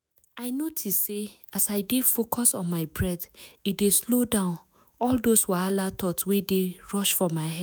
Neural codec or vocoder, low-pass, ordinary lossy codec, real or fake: autoencoder, 48 kHz, 128 numbers a frame, DAC-VAE, trained on Japanese speech; none; none; fake